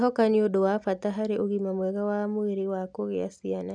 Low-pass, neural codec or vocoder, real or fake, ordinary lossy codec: 9.9 kHz; none; real; none